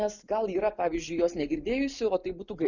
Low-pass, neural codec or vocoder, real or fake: 7.2 kHz; none; real